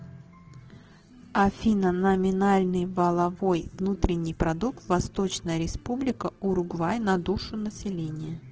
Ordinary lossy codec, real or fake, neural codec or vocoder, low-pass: Opus, 16 kbps; real; none; 7.2 kHz